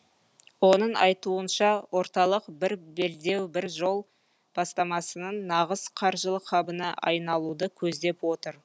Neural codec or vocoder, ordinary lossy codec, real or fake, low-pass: none; none; real; none